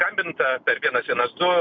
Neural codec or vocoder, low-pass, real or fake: none; 7.2 kHz; real